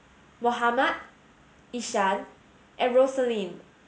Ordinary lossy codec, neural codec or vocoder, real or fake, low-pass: none; none; real; none